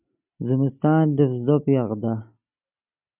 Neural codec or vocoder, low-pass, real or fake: none; 3.6 kHz; real